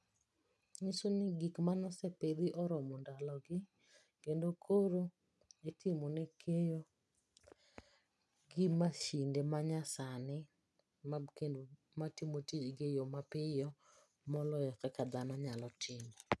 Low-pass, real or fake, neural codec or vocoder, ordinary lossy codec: none; real; none; none